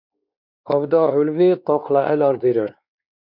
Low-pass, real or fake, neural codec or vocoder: 5.4 kHz; fake; codec, 16 kHz, 2 kbps, X-Codec, WavLM features, trained on Multilingual LibriSpeech